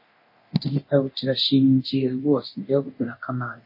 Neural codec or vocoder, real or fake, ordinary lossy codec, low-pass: codec, 24 kHz, 0.9 kbps, DualCodec; fake; MP3, 24 kbps; 5.4 kHz